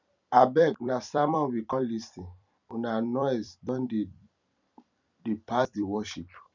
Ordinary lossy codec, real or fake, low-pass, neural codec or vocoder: none; fake; 7.2 kHz; vocoder, 44.1 kHz, 128 mel bands every 256 samples, BigVGAN v2